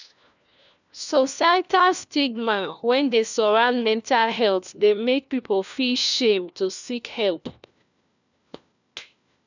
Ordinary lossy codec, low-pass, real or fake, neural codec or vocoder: none; 7.2 kHz; fake; codec, 16 kHz, 1 kbps, FunCodec, trained on LibriTTS, 50 frames a second